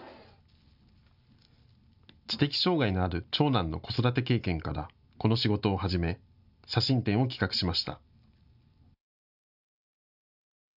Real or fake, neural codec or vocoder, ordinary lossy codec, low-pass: real; none; none; 5.4 kHz